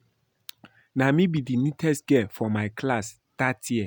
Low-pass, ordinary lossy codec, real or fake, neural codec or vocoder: none; none; real; none